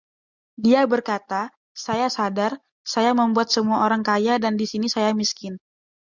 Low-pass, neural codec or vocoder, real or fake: 7.2 kHz; none; real